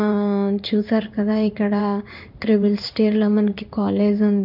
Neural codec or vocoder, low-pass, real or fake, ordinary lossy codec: codec, 16 kHz in and 24 kHz out, 1 kbps, XY-Tokenizer; 5.4 kHz; fake; none